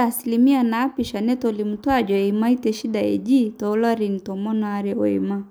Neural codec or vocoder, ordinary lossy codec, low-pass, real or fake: none; none; none; real